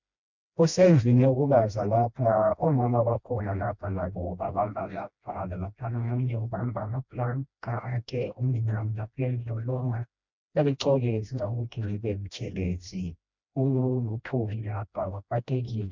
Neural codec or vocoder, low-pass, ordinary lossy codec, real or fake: codec, 16 kHz, 1 kbps, FreqCodec, smaller model; 7.2 kHz; MP3, 64 kbps; fake